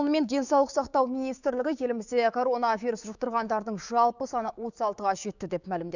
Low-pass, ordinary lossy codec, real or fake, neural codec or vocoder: 7.2 kHz; none; fake; codec, 16 kHz, 6 kbps, DAC